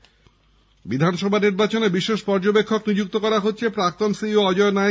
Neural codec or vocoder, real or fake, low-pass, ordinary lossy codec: none; real; none; none